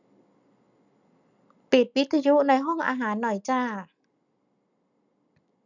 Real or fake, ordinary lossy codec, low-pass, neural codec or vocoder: real; none; 7.2 kHz; none